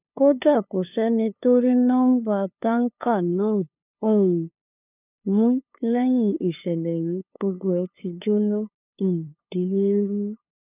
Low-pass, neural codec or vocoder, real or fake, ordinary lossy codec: 3.6 kHz; codec, 16 kHz, 2 kbps, FunCodec, trained on LibriTTS, 25 frames a second; fake; none